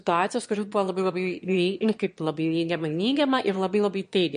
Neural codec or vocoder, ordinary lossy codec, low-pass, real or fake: autoencoder, 22.05 kHz, a latent of 192 numbers a frame, VITS, trained on one speaker; MP3, 48 kbps; 9.9 kHz; fake